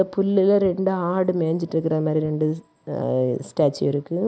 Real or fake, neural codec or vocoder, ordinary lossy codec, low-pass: real; none; none; none